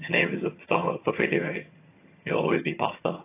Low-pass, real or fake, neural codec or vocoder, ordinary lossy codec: 3.6 kHz; fake; vocoder, 22.05 kHz, 80 mel bands, HiFi-GAN; AAC, 24 kbps